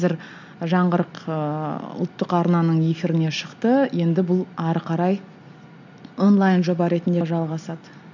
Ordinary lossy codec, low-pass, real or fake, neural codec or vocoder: none; 7.2 kHz; real; none